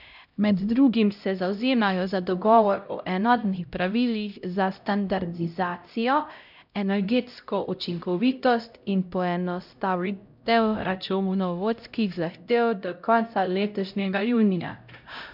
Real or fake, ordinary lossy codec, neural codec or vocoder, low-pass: fake; none; codec, 16 kHz, 0.5 kbps, X-Codec, HuBERT features, trained on LibriSpeech; 5.4 kHz